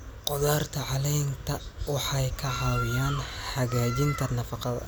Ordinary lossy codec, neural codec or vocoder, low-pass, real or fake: none; none; none; real